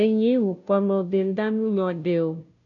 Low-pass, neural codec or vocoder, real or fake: 7.2 kHz; codec, 16 kHz, 0.5 kbps, FunCodec, trained on Chinese and English, 25 frames a second; fake